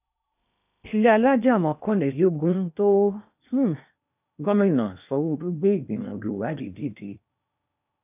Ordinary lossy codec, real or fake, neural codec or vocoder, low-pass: none; fake; codec, 16 kHz in and 24 kHz out, 0.8 kbps, FocalCodec, streaming, 65536 codes; 3.6 kHz